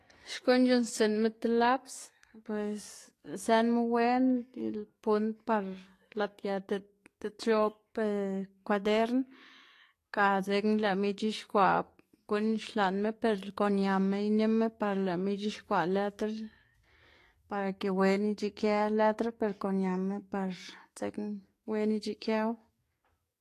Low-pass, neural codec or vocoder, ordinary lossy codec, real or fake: 14.4 kHz; codec, 44.1 kHz, 7.8 kbps, DAC; AAC, 48 kbps; fake